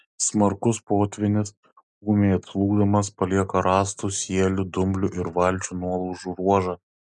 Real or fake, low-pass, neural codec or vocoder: real; 10.8 kHz; none